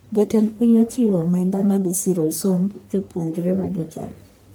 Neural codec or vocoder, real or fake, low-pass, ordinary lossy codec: codec, 44.1 kHz, 1.7 kbps, Pupu-Codec; fake; none; none